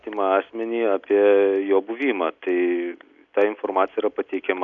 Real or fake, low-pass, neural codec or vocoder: real; 7.2 kHz; none